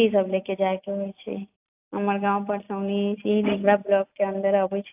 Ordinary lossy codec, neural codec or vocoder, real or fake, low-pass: AAC, 32 kbps; none; real; 3.6 kHz